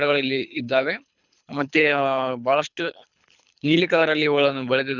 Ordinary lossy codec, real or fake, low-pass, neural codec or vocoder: none; fake; 7.2 kHz; codec, 24 kHz, 3 kbps, HILCodec